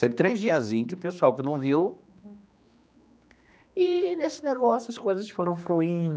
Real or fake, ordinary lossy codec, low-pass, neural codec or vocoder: fake; none; none; codec, 16 kHz, 2 kbps, X-Codec, HuBERT features, trained on general audio